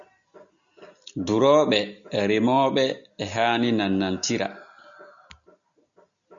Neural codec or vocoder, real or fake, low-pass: none; real; 7.2 kHz